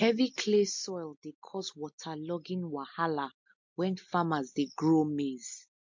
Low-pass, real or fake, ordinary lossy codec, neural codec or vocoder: 7.2 kHz; real; MP3, 48 kbps; none